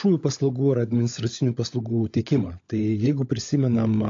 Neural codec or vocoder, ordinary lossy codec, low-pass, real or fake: codec, 16 kHz, 16 kbps, FunCodec, trained on LibriTTS, 50 frames a second; AAC, 48 kbps; 7.2 kHz; fake